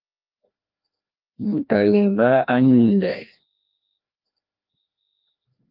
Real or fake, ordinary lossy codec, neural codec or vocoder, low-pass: fake; Opus, 24 kbps; codec, 16 kHz, 1 kbps, FreqCodec, larger model; 5.4 kHz